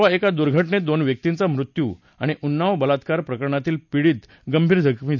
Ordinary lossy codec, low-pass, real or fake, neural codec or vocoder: none; 7.2 kHz; real; none